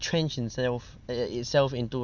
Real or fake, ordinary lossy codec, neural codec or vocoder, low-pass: real; none; none; 7.2 kHz